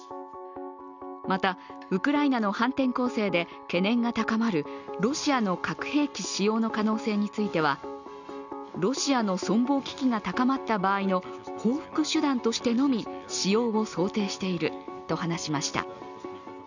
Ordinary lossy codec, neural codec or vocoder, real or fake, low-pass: none; none; real; 7.2 kHz